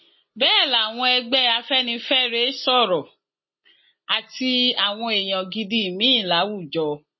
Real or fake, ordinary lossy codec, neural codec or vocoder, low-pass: real; MP3, 24 kbps; none; 7.2 kHz